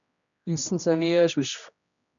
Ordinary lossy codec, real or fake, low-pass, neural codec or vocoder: MP3, 96 kbps; fake; 7.2 kHz; codec, 16 kHz, 1 kbps, X-Codec, HuBERT features, trained on general audio